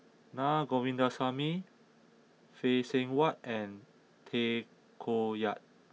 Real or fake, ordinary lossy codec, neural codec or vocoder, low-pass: real; none; none; none